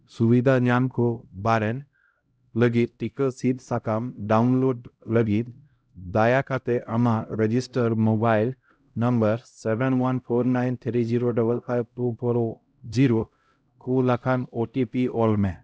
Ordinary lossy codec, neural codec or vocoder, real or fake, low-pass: none; codec, 16 kHz, 0.5 kbps, X-Codec, HuBERT features, trained on LibriSpeech; fake; none